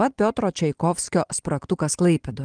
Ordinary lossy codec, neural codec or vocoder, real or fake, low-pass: Opus, 24 kbps; none; real; 9.9 kHz